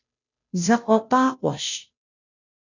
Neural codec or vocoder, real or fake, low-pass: codec, 16 kHz, 0.5 kbps, FunCodec, trained on Chinese and English, 25 frames a second; fake; 7.2 kHz